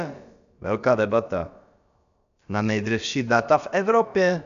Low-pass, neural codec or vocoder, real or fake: 7.2 kHz; codec, 16 kHz, about 1 kbps, DyCAST, with the encoder's durations; fake